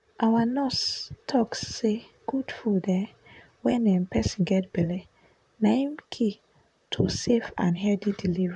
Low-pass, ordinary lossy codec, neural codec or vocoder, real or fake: 10.8 kHz; none; vocoder, 44.1 kHz, 128 mel bands, Pupu-Vocoder; fake